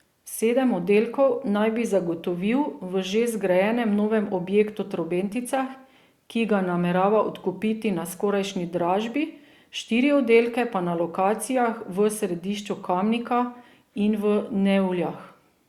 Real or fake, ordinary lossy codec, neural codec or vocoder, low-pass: real; Opus, 64 kbps; none; 19.8 kHz